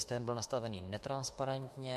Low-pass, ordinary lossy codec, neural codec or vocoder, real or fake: 14.4 kHz; MP3, 64 kbps; autoencoder, 48 kHz, 32 numbers a frame, DAC-VAE, trained on Japanese speech; fake